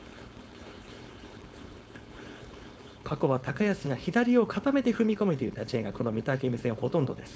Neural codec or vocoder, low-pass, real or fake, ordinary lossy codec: codec, 16 kHz, 4.8 kbps, FACodec; none; fake; none